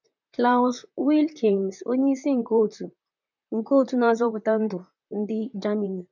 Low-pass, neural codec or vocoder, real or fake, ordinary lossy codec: 7.2 kHz; vocoder, 44.1 kHz, 128 mel bands, Pupu-Vocoder; fake; none